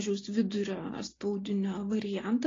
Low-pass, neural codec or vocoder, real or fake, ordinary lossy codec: 7.2 kHz; none; real; AAC, 32 kbps